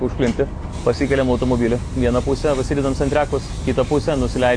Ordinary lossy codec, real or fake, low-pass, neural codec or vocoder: AAC, 48 kbps; real; 9.9 kHz; none